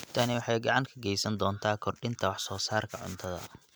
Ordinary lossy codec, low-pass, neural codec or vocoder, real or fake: none; none; none; real